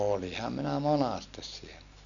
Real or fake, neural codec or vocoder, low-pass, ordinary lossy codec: real; none; 7.2 kHz; none